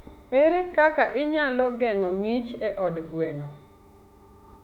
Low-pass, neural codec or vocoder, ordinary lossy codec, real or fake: 19.8 kHz; autoencoder, 48 kHz, 32 numbers a frame, DAC-VAE, trained on Japanese speech; none; fake